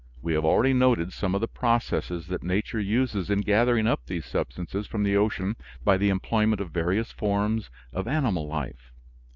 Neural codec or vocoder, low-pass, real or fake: none; 7.2 kHz; real